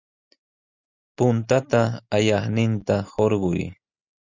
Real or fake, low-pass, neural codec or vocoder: real; 7.2 kHz; none